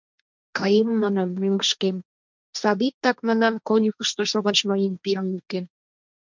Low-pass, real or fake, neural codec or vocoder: 7.2 kHz; fake; codec, 16 kHz, 1.1 kbps, Voila-Tokenizer